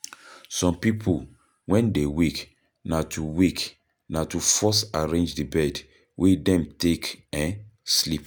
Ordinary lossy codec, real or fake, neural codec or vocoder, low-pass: none; real; none; none